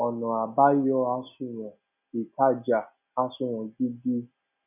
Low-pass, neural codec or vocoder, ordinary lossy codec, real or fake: 3.6 kHz; none; none; real